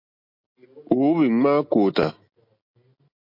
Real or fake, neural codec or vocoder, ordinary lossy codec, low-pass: real; none; MP3, 32 kbps; 5.4 kHz